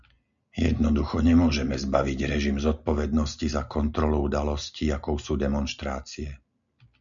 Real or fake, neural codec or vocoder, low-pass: real; none; 7.2 kHz